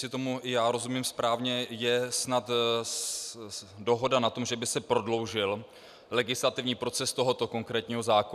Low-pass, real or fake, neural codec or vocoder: 14.4 kHz; real; none